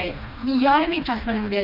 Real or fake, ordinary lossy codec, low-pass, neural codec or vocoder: fake; none; 5.4 kHz; codec, 16 kHz, 2 kbps, FreqCodec, smaller model